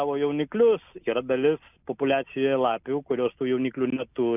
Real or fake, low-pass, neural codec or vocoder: real; 3.6 kHz; none